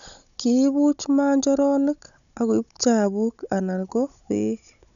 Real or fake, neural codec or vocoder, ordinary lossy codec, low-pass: fake; codec, 16 kHz, 16 kbps, FunCodec, trained on Chinese and English, 50 frames a second; none; 7.2 kHz